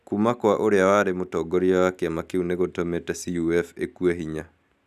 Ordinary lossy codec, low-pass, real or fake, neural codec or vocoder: none; 14.4 kHz; real; none